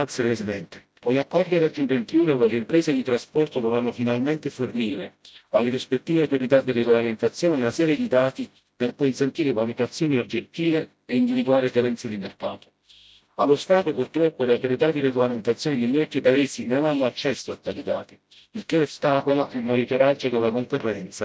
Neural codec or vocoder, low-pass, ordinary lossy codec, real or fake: codec, 16 kHz, 0.5 kbps, FreqCodec, smaller model; none; none; fake